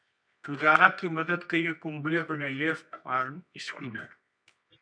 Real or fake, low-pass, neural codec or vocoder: fake; 9.9 kHz; codec, 24 kHz, 0.9 kbps, WavTokenizer, medium music audio release